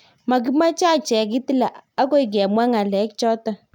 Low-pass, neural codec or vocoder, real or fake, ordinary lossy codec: 19.8 kHz; none; real; none